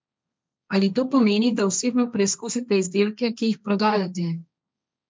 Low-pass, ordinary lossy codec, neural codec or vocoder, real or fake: none; none; codec, 16 kHz, 1.1 kbps, Voila-Tokenizer; fake